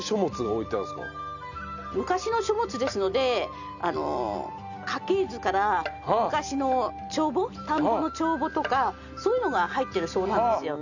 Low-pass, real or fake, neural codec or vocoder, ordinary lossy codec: 7.2 kHz; real; none; none